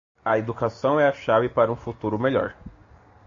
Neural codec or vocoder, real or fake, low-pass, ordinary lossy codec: none; real; 7.2 kHz; AAC, 48 kbps